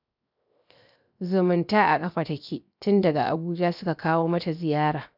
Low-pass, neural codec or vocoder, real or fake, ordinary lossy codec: 5.4 kHz; codec, 16 kHz, 0.7 kbps, FocalCodec; fake; none